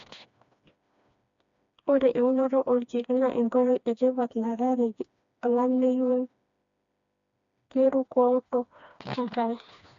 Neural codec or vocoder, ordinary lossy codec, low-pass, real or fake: codec, 16 kHz, 2 kbps, FreqCodec, smaller model; MP3, 64 kbps; 7.2 kHz; fake